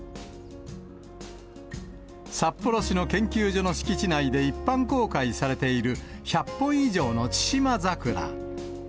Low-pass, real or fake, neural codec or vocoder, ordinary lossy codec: none; real; none; none